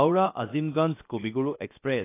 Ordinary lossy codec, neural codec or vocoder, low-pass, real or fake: AAC, 24 kbps; codec, 16 kHz, 0.7 kbps, FocalCodec; 3.6 kHz; fake